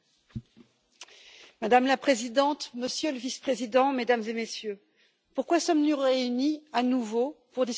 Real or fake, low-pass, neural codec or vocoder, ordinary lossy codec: real; none; none; none